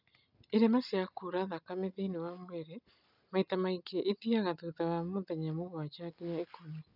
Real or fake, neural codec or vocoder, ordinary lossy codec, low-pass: real; none; none; 5.4 kHz